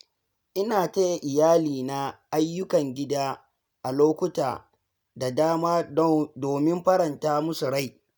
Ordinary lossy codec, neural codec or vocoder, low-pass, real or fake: none; none; none; real